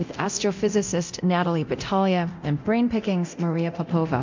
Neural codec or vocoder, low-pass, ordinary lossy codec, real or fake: codec, 24 kHz, 0.9 kbps, DualCodec; 7.2 kHz; MP3, 64 kbps; fake